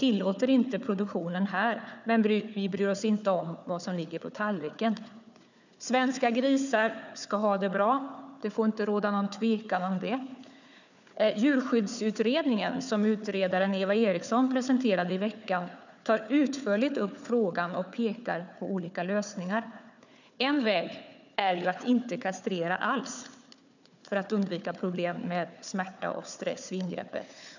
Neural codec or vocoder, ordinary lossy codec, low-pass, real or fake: codec, 16 kHz, 4 kbps, FunCodec, trained on Chinese and English, 50 frames a second; none; 7.2 kHz; fake